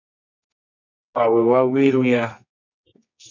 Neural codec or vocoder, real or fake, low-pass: codec, 24 kHz, 0.9 kbps, WavTokenizer, medium music audio release; fake; 7.2 kHz